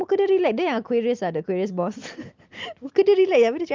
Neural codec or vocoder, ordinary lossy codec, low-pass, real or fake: none; Opus, 32 kbps; 7.2 kHz; real